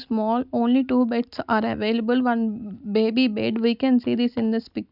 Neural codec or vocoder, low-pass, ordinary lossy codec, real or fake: none; 5.4 kHz; none; real